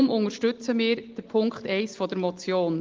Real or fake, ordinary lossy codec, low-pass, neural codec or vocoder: real; Opus, 16 kbps; 7.2 kHz; none